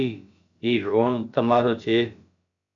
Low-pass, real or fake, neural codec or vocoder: 7.2 kHz; fake; codec, 16 kHz, about 1 kbps, DyCAST, with the encoder's durations